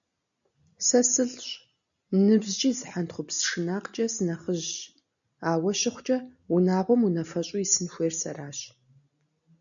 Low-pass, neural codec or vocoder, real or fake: 7.2 kHz; none; real